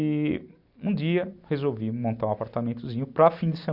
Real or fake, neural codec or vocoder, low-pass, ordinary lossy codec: real; none; 5.4 kHz; none